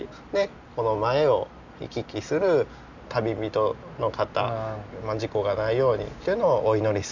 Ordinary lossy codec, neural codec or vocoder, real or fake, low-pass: none; none; real; 7.2 kHz